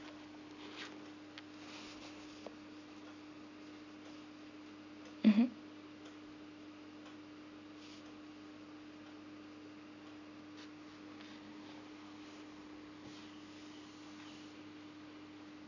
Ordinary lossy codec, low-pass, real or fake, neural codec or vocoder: none; 7.2 kHz; real; none